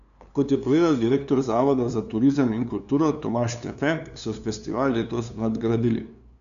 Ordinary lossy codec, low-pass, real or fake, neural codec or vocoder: none; 7.2 kHz; fake; codec, 16 kHz, 2 kbps, FunCodec, trained on LibriTTS, 25 frames a second